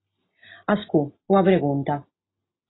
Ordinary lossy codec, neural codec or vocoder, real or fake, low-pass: AAC, 16 kbps; none; real; 7.2 kHz